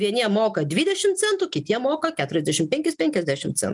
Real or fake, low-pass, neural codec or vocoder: real; 10.8 kHz; none